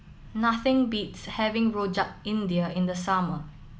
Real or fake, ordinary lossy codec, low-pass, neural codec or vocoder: real; none; none; none